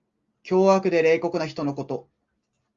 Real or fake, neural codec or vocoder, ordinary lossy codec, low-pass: real; none; Opus, 32 kbps; 7.2 kHz